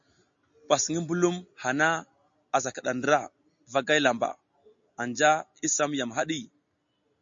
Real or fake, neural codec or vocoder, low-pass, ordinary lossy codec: real; none; 7.2 kHz; MP3, 48 kbps